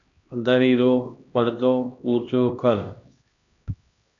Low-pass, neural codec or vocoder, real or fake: 7.2 kHz; codec, 16 kHz, 1 kbps, X-Codec, HuBERT features, trained on LibriSpeech; fake